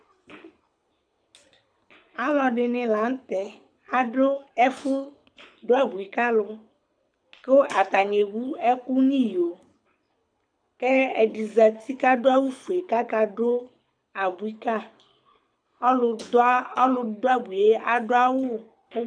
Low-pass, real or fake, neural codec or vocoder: 9.9 kHz; fake; codec, 24 kHz, 6 kbps, HILCodec